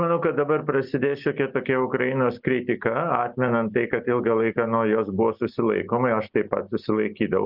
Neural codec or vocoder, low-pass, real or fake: none; 5.4 kHz; real